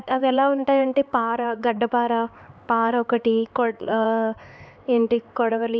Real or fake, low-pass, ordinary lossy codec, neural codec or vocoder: fake; none; none; codec, 16 kHz, 4 kbps, X-Codec, HuBERT features, trained on LibriSpeech